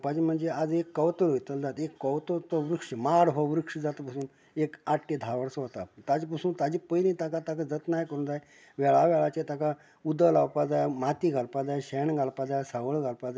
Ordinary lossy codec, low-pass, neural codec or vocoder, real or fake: none; none; none; real